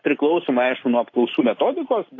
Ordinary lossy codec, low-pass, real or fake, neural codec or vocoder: AAC, 32 kbps; 7.2 kHz; real; none